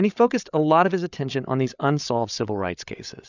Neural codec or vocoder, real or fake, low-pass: codec, 16 kHz, 8 kbps, FreqCodec, larger model; fake; 7.2 kHz